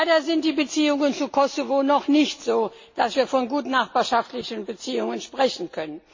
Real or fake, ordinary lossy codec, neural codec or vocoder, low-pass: real; MP3, 32 kbps; none; 7.2 kHz